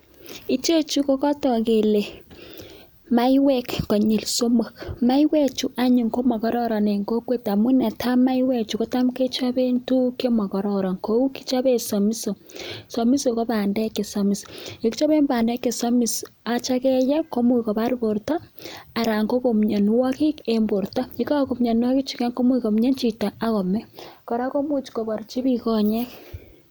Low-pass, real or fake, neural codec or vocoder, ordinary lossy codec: none; real; none; none